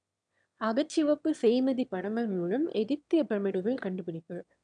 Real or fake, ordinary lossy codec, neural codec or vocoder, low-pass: fake; none; autoencoder, 22.05 kHz, a latent of 192 numbers a frame, VITS, trained on one speaker; 9.9 kHz